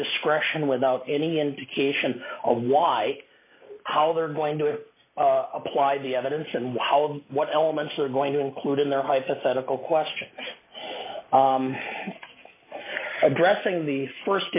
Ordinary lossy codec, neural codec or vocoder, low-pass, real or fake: AAC, 24 kbps; none; 3.6 kHz; real